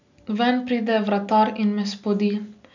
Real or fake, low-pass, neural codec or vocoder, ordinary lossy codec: real; 7.2 kHz; none; none